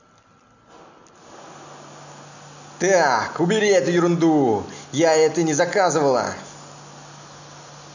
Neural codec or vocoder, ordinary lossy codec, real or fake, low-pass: none; none; real; 7.2 kHz